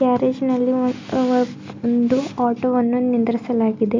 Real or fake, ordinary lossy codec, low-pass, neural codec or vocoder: real; MP3, 64 kbps; 7.2 kHz; none